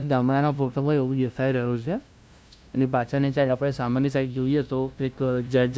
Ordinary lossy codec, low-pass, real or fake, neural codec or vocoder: none; none; fake; codec, 16 kHz, 0.5 kbps, FunCodec, trained on LibriTTS, 25 frames a second